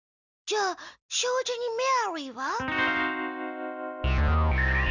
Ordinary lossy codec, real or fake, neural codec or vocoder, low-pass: none; real; none; 7.2 kHz